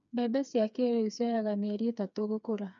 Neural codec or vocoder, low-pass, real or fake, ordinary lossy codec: codec, 16 kHz, 4 kbps, FreqCodec, smaller model; 7.2 kHz; fake; none